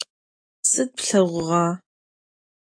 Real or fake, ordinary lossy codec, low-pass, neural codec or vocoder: fake; AAC, 32 kbps; 9.9 kHz; autoencoder, 48 kHz, 128 numbers a frame, DAC-VAE, trained on Japanese speech